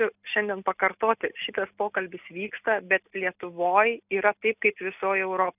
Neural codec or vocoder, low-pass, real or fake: none; 3.6 kHz; real